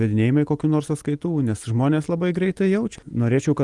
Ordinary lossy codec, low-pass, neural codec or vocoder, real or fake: Opus, 24 kbps; 10.8 kHz; none; real